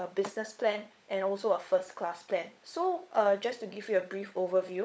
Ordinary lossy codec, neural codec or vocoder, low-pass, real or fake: none; codec, 16 kHz, 16 kbps, FunCodec, trained on LibriTTS, 50 frames a second; none; fake